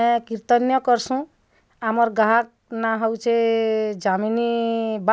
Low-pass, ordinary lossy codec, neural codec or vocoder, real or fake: none; none; none; real